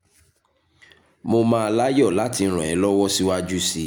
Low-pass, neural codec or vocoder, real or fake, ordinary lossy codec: none; none; real; none